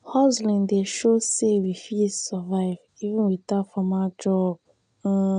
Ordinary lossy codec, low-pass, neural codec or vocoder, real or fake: none; 9.9 kHz; none; real